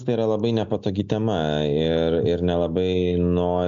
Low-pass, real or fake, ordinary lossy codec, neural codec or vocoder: 7.2 kHz; real; MP3, 64 kbps; none